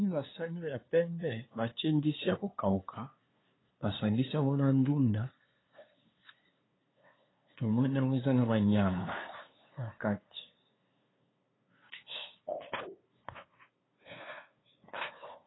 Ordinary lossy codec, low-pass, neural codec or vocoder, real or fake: AAC, 16 kbps; 7.2 kHz; codec, 16 kHz, 2 kbps, FunCodec, trained on LibriTTS, 25 frames a second; fake